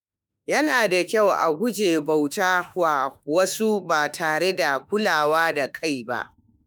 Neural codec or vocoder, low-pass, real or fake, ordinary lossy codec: autoencoder, 48 kHz, 32 numbers a frame, DAC-VAE, trained on Japanese speech; none; fake; none